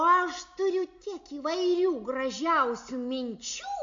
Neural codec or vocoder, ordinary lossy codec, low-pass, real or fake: none; AAC, 64 kbps; 7.2 kHz; real